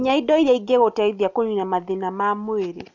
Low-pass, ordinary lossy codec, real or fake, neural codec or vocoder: 7.2 kHz; none; real; none